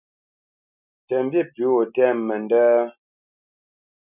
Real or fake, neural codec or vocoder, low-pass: real; none; 3.6 kHz